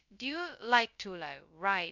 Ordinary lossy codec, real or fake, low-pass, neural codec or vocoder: none; fake; 7.2 kHz; codec, 16 kHz, 0.2 kbps, FocalCodec